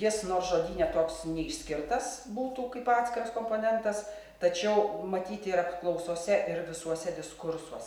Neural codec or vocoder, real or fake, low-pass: none; real; 19.8 kHz